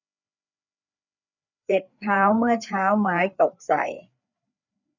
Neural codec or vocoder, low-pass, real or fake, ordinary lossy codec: codec, 16 kHz, 4 kbps, FreqCodec, larger model; 7.2 kHz; fake; none